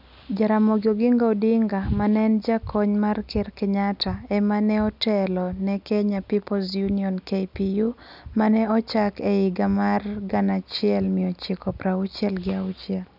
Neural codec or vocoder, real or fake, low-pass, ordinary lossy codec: none; real; 5.4 kHz; none